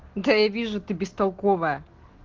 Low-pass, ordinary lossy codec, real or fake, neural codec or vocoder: 7.2 kHz; Opus, 16 kbps; real; none